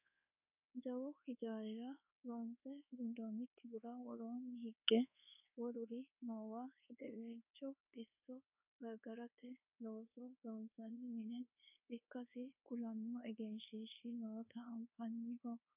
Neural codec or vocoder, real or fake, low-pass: codec, 24 kHz, 1.2 kbps, DualCodec; fake; 3.6 kHz